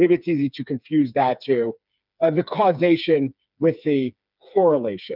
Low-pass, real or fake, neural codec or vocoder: 5.4 kHz; fake; codec, 16 kHz, 4 kbps, FreqCodec, smaller model